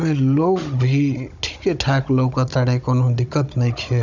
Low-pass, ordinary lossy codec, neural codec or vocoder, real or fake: 7.2 kHz; none; codec, 16 kHz, 16 kbps, FunCodec, trained on Chinese and English, 50 frames a second; fake